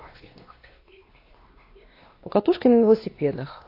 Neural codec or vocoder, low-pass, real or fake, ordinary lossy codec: codec, 16 kHz, 2 kbps, X-Codec, WavLM features, trained on Multilingual LibriSpeech; 5.4 kHz; fake; AAC, 32 kbps